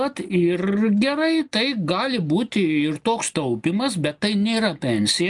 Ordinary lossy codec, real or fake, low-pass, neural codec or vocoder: MP3, 96 kbps; real; 10.8 kHz; none